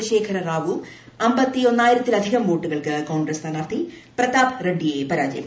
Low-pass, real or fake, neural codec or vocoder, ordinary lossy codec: none; real; none; none